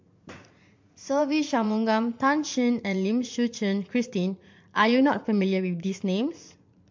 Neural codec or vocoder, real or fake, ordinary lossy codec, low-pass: vocoder, 44.1 kHz, 80 mel bands, Vocos; fake; MP3, 48 kbps; 7.2 kHz